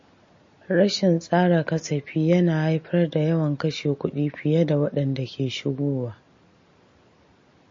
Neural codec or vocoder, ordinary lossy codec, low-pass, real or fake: none; MP3, 32 kbps; 7.2 kHz; real